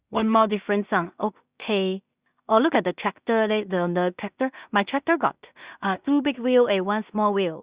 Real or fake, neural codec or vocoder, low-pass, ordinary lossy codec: fake; codec, 16 kHz in and 24 kHz out, 0.4 kbps, LongCat-Audio-Codec, two codebook decoder; 3.6 kHz; Opus, 64 kbps